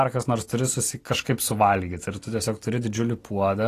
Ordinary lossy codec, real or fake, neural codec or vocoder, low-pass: AAC, 48 kbps; real; none; 14.4 kHz